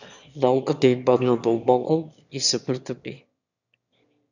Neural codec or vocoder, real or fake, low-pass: autoencoder, 22.05 kHz, a latent of 192 numbers a frame, VITS, trained on one speaker; fake; 7.2 kHz